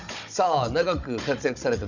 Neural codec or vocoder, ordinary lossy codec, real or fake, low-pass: codec, 16 kHz, 16 kbps, FunCodec, trained on Chinese and English, 50 frames a second; none; fake; 7.2 kHz